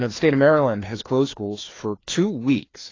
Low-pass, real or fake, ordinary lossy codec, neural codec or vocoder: 7.2 kHz; fake; AAC, 32 kbps; codec, 16 kHz, 2 kbps, FreqCodec, larger model